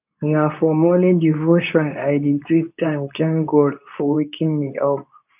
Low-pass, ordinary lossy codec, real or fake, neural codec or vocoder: 3.6 kHz; none; fake; codec, 24 kHz, 0.9 kbps, WavTokenizer, medium speech release version 2